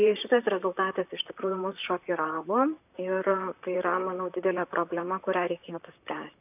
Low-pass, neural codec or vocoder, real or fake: 3.6 kHz; vocoder, 44.1 kHz, 128 mel bands, Pupu-Vocoder; fake